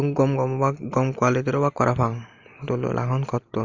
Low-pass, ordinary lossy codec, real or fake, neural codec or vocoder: 7.2 kHz; Opus, 32 kbps; real; none